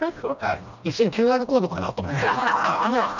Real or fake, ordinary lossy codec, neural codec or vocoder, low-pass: fake; none; codec, 16 kHz, 1 kbps, FreqCodec, smaller model; 7.2 kHz